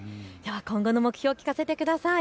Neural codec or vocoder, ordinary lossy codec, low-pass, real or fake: none; none; none; real